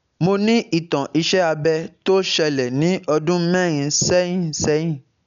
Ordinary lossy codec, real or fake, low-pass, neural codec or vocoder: none; real; 7.2 kHz; none